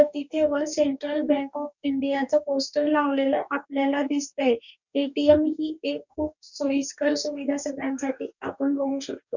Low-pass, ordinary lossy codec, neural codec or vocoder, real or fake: 7.2 kHz; none; codec, 44.1 kHz, 2.6 kbps, DAC; fake